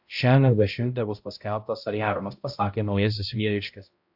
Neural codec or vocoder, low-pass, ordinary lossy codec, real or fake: codec, 16 kHz, 0.5 kbps, X-Codec, HuBERT features, trained on balanced general audio; 5.4 kHz; AAC, 48 kbps; fake